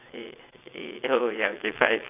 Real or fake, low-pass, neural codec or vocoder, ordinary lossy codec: fake; 3.6 kHz; vocoder, 22.05 kHz, 80 mel bands, WaveNeXt; none